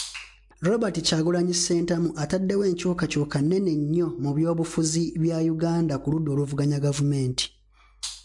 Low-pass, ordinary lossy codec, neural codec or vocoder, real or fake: 10.8 kHz; MP3, 64 kbps; none; real